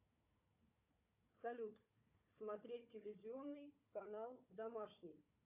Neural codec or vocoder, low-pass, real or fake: codec, 16 kHz, 4 kbps, FunCodec, trained on Chinese and English, 50 frames a second; 3.6 kHz; fake